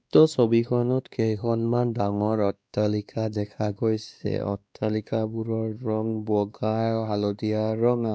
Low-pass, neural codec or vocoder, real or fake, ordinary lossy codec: none; codec, 16 kHz, 2 kbps, X-Codec, WavLM features, trained on Multilingual LibriSpeech; fake; none